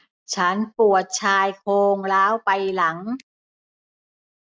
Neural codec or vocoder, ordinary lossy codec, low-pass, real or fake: none; none; none; real